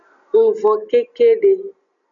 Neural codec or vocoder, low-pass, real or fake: none; 7.2 kHz; real